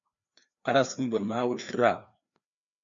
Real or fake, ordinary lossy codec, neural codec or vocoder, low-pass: fake; MP3, 64 kbps; codec, 16 kHz, 2 kbps, FreqCodec, larger model; 7.2 kHz